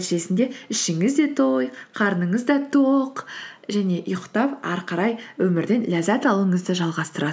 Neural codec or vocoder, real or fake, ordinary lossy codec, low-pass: none; real; none; none